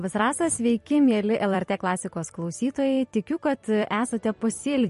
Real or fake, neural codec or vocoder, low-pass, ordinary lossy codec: fake; vocoder, 44.1 kHz, 128 mel bands every 256 samples, BigVGAN v2; 14.4 kHz; MP3, 48 kbps